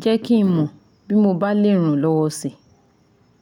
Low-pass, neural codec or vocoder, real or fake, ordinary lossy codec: 19.8 kHz; none; real; none